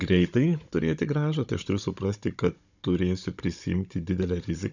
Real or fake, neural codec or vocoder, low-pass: fake; codec, 16 kHz, 16 kbps, FunCodec, trained on Chinese and English, 50 frames a second; 7.2 kHz